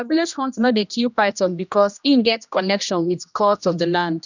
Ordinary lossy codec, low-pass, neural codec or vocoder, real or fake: none; 7.2 kHz; codec, 16 kHz, 1 kbps, X-Codec, HuBERT features, trained on general audio; fake